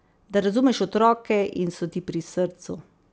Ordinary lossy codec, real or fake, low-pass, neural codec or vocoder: none; real; none; none